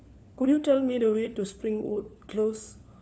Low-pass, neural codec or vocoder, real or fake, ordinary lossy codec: none; codec, 16 kHz, 4 kbps, FunCodec, trained on LibriTTS, 50 frames a second; fake; none